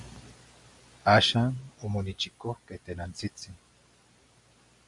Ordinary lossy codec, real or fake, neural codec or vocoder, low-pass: MP3, 64 kbps; real; none; 10.8 kHz